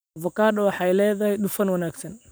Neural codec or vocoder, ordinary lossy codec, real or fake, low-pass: none; none; real; none